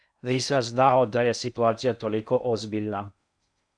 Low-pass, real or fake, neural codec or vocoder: 9.9 kHz; fake; codec, 16 kHz in and 24 kHz out, 0.6 kbps, FocalCodec, streaming, 2048 codes